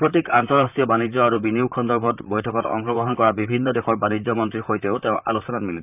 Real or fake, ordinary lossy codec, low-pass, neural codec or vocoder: fake; none; 3.6 kHz; vocoder, 44.1 kHz, 128 mel bands, Pupu-Vocoder